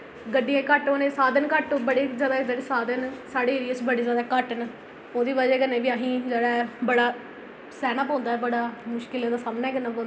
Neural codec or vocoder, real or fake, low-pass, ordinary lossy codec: none; real; none; none